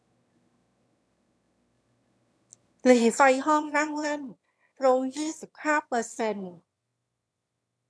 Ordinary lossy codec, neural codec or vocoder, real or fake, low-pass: none; autoencoder, 22.05 kHz, a latent of 192 numbers a frame, VITS, trained on one speaker; fake; none